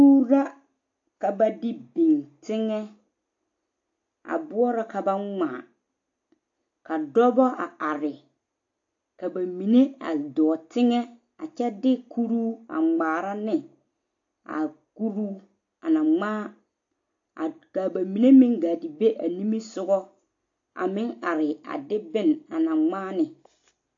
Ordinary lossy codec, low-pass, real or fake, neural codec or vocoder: AAC, 48 kbps; 7.2 kHz; real; none